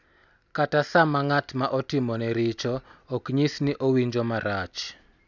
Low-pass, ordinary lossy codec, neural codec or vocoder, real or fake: 7.2 kHz; none; none; real